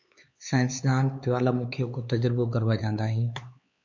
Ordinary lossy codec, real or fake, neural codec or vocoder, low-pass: MP3, 48 kbps; fake; codec, 16 kHz, 4 kbps, X-Codec, HuBERT features, trained on LibriSpeech; 7.2 kHz